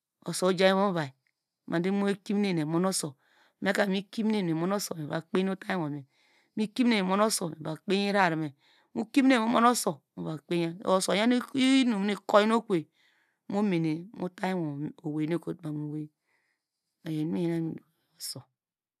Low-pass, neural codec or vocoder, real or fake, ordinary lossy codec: 14.4 kHz; none; real; none